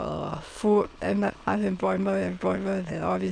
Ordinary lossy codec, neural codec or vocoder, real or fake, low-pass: none; autoencoder, 22.05 kHz, a latent of 192 numbers a frame, VITS, trained on many speakers; fake; 9.9 kHz